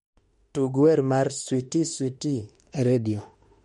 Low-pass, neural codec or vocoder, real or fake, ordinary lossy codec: 19.8 kHz; autoencoder, 48 kHz, 32 numbers a frame, DAC-VAE, trained on Japanese speech; fake; MP3, 48 kbps